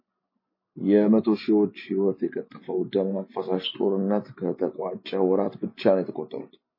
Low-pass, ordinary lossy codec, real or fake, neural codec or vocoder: 5.4 kHz; MP3, 24 kbps; real; none